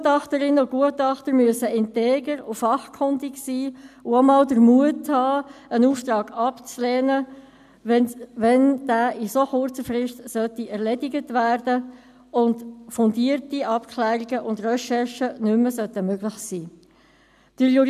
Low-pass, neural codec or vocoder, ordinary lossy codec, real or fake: 14.4 kHz; none; none; real